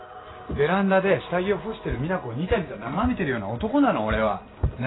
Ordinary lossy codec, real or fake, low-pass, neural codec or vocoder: AAC, 16 kbps; real; 7.2 kHz; none